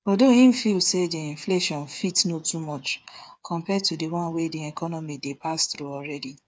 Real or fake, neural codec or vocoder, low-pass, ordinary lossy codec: fake; codec, 16 kHz, 8 kbps, FreqCodec, smaller model; none; none